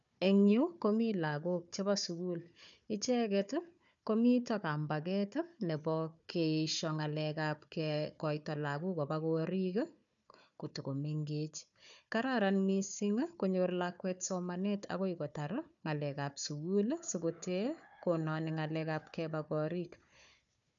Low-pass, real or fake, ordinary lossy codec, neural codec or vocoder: 7.2 kHz; fake; none; codec, 16 kHz, 4 kbps, FunCodec, trained on Chinese and English, 50 frames a second